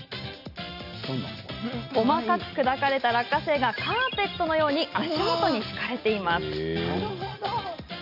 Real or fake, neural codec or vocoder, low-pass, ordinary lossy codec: real; none; 5.4 kHz; none